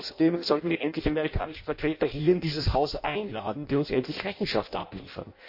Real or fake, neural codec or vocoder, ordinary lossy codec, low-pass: fake; codec, 16 kHz in and 24 kHz out, 0.6 kbps, FireRedTTS-2 codec; MP3, 32 kbps; 5.4 kHz